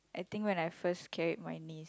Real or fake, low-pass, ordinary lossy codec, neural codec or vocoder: real; none; none; none